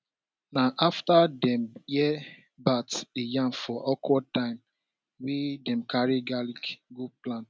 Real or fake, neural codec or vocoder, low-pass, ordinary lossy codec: real; none; none; none